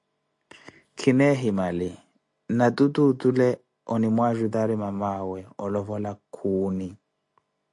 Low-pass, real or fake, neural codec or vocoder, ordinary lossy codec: 10.8 kHz; real; none; AAC, 64 kbps